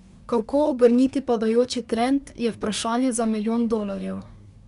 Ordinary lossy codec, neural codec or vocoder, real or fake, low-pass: none; codec, 24 kHz, 1 kbps, SNAC; fake; 10.8 kHz